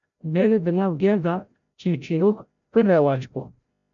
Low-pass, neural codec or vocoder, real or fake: 7.2 kHz; codec, 16 kHz, 0.5 kbps, FreqCodec, larger model; fake